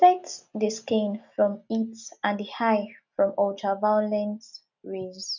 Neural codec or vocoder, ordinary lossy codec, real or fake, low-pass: none; none; real; 7.2 kHz